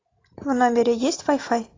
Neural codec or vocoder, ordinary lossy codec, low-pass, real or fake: none; AAC, 32 kbps; 7.2 kHz; real